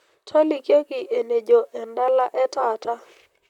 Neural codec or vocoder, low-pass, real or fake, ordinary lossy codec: vocoder, 44.1 kHz, 128 mel bands, Pupu-Vocoder; 19.8 kHz; fake; MP3, 96 kbps